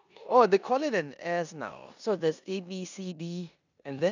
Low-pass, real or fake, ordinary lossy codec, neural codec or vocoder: 7.2 kHz; fake; none; codec, 16 kHz in and 24 kHz out, 0.9 kbps, LongCat-Audio-Codec, four codebook decoder